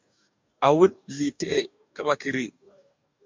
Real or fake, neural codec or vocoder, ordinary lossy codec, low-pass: fake; codec, 44.1 kHz, 2.6 kbps, DAC; MP3, 64 kbps; 7.2 kHz